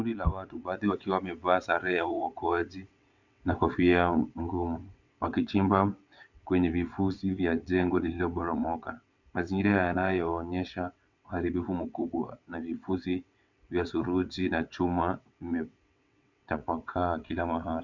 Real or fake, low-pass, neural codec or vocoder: fake; 7.2 kHz; vocoder, 22.05 kHz, 80 mel bands, WaveNeXt